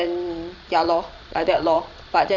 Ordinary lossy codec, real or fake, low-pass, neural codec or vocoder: none; fake; 7.2 kHz; vocoder, 22.05 kHz, 80 mel bands, Vocos